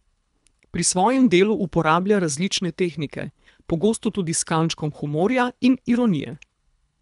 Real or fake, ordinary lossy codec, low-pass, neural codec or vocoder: fake; none; 10.8 kHz; codec, 24 kHz, 3 kbps, HILCodec